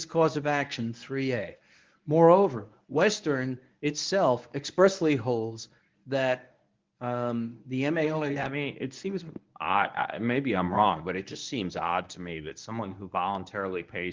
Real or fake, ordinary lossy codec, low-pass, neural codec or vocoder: fake; Opus, 32 kbps; 7.2 kHz; codec, 24 kHz, 0.9 kbps, WavTokenizer, medium speech release version 1